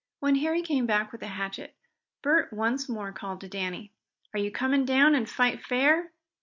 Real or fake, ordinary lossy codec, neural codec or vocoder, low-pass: real; MP3, 48 kbps; none; 7.2 kHz